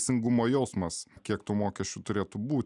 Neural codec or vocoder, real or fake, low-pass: none; real; 10.8 kHz